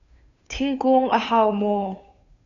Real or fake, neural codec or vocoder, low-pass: fake; codec, 16 kHz, 2 kbps, FunCodec, trained on Chinese and English, 25 frames a second; 7.2 kHz